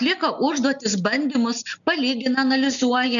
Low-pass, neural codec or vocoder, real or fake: 7.2 kHz; none; real